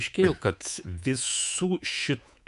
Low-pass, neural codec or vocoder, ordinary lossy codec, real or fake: 10.8 kHz; codec, 24 kHz, 3.1 kbps, DualCodec; MP3, 96 kbps; fake